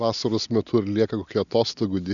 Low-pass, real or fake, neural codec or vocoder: 7.2 kHz; real; none